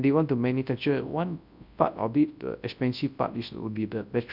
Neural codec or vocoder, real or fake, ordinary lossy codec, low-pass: codec, 24 kHz, 0.9 kbps, WavTokenizer, large speech release; fake; none; 5.4 kHz